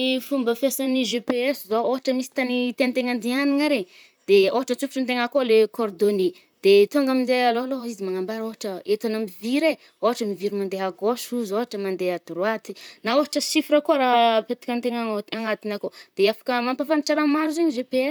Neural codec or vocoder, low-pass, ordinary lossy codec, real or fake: vocoder, 44.1 kHz, 128 mel bands, Pupu-Vocoder; none; none; fake